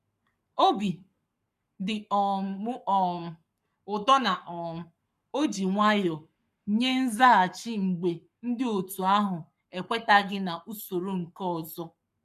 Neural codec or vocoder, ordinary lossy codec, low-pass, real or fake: codec, 44.1 kHz, 7.8 kbps, Pupu-Codec; none; 14.4 kHz; fake